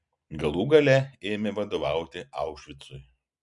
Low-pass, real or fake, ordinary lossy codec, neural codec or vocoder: 10.8 kHz; real; MP3, 64 kbps; none